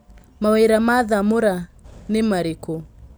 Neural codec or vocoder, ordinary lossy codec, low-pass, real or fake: none; none; none; real